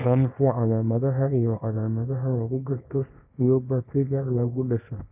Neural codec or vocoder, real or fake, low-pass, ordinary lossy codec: codec, 24 kHz, 0.9 kbps, WavTokenizer, small release; fake; 3.6 kHz; AAC, 32 kbps